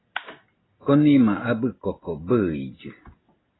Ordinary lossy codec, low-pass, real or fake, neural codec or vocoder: AAC, 16 kbps; 7.2 kHz; real; none